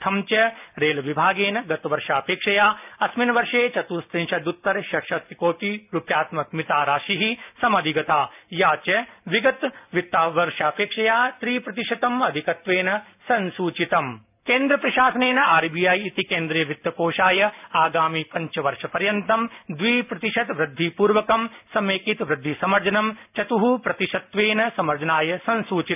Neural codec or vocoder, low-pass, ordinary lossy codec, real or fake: none; 3.6 kHz; none; real